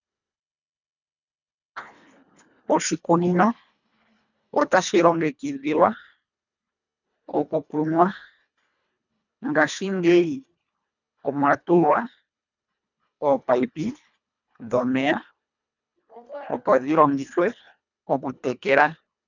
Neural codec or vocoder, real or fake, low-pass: codec, 24 kHz, 1.5 kbps, HILCodec; fake; 7.2 kHz